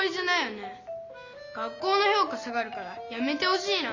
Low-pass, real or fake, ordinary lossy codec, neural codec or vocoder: 7.2 kHz; real; Opus, 64 kbps; none